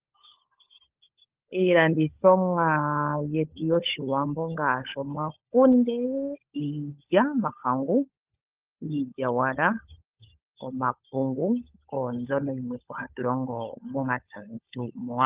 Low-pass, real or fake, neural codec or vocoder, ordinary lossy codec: 3.6 kHz; fake; codec, 16 kHz, 16 kbps, FunCodec, trained on LibriTTS, 50 frames a second; Opus, 32 kbps